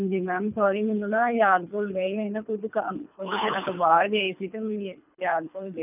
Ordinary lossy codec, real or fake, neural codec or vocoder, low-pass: none; fake; codec, 24 kHz, 3 kbps, HILCodec; 3.6 kHz